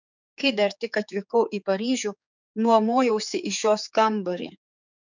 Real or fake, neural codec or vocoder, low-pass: fake; codec, 16 kHz, 4 kbps, X-Codec, HuBERT features, trained on general audio; 7.2 kHz